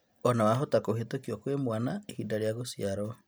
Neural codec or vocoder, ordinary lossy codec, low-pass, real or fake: none; none; none; real